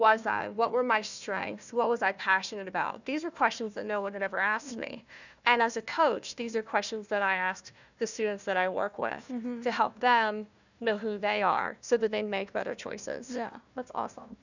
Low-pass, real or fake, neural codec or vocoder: 7.2 kHz; fake; codec, 16 kHz, 1 kbps, FunCodec, trained on Chinese and English, 50 frames a second